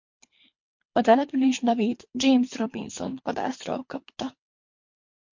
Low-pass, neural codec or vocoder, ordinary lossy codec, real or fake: 7.2 kHz; codec, 24 kHz, 3 kbps, HILCodec; MP3, 48 kbps; fake